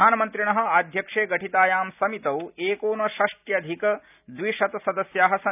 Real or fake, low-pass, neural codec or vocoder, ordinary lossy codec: real; 3.6 kHz; none; none